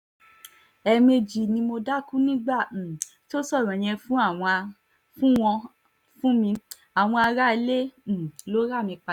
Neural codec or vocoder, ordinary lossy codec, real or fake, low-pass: none; none; real; 19.8 kHz